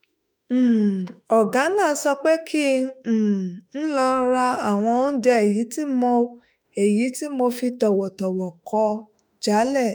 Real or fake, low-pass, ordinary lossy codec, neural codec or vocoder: fake; none; none; autoencoder, 48 kHz, 32 numbers a frame, DAC-VAE, trained on Japanese speech